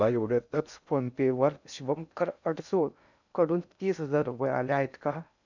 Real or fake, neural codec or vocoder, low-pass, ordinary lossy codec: fake; codec, 16 kHz in and 24 kHz out, 0.6 kbps, FocalCodec, streaming, 2048 codes; 7.2 kHz; none